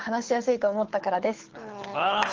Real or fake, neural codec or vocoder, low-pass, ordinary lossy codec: fake; codec, 24 kHz, 6 kbps, HILCodec; 7.2 kHz; Opus, 16 kbps